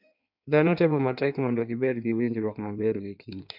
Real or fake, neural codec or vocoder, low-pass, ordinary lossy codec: fake; codec, 16 kHz in and 24 kHz out, 1.1 kbps, FireRedTTS-2 codec; 5.4 kHz; MP3, 48 kbps